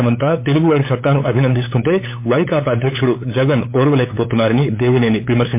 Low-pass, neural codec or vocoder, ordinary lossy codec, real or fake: 3.6 kHz; codec, 16 kHz, 8 kbps, FunCodec, trained on LibriTTS, 25 frames a second; MP3, 24 kbps; fake